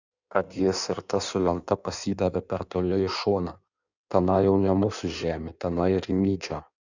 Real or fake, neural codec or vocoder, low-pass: fake; codec, 16 kHz in and 24 kHz out, 1.1 kbps, FireRedTTS-2 codec; 7.2 kHz